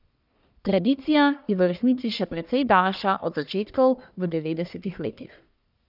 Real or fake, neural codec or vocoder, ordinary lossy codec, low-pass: fake; codec, 44.1 kHz, 1.7 kbps, Pupu-Codec; none; 5.4 kHz